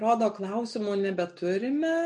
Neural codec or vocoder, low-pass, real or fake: none; 10.8 kHz; real